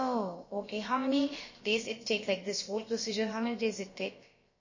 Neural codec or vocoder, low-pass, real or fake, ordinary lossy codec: codec, 16 kHz, about 1 kbps, DyCAST, with the encoder's durations; 7.2 kHz; fake; MP3, 32 kbps